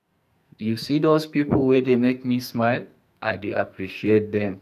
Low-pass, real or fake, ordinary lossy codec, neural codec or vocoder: 14.4 kHz; fake; none; codec, 44.1 kHz, 2.6 kbps, SNAC